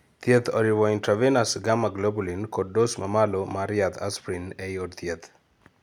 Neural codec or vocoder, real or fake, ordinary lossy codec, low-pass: none; real; none; 19.8 kHz